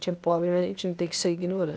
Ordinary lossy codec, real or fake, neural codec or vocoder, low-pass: none; fake; codec, 16 kHz, 0.8 kbps, ZipCodec; none